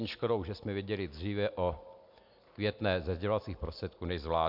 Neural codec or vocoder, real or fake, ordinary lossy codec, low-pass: none; real; MP3, 48 kbps; 5.4 kHz